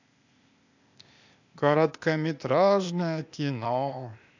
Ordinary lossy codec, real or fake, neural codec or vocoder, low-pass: none; fake; codec, 16 kHz, 0.8 kbps, ZipCodec; 7.2 kHz